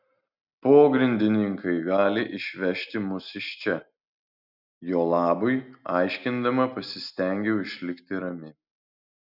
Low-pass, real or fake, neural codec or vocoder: 5.4 kHz; real; none